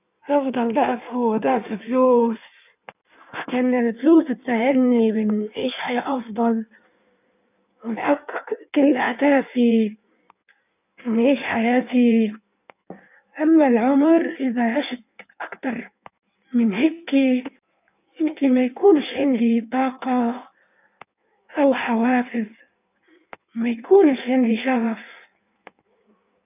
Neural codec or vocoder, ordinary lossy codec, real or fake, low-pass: codec, 16 kHz in and 24 kHz out, 1.1 kbps, FireRedTTS-2 codec; none; fake; 3.6 kHz